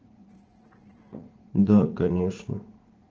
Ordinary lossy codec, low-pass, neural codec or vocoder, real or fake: Opus, 16 kbps; 7.2 kHz; vocoder, 44.1 kHz, 80 mel bands, Vocos; fake